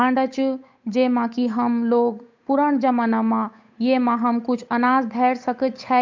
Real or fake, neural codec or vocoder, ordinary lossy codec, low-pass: fake; codec, 16 kHz, 8 kbps, FunCodec, trained on Chinese and English, 25 frames a second; MP3, 64 kbps; 7.2 kHz